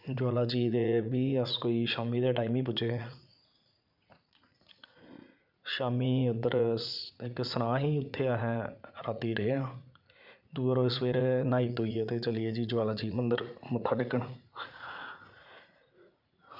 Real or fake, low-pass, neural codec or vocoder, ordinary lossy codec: fake; 5.4 kHz; vocoder, 44.1 kHz, 80 mel bands, Vocos; none